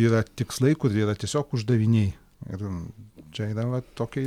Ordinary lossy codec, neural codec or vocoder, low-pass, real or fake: MP3, 96 kbps; none; 19.8 kHz; real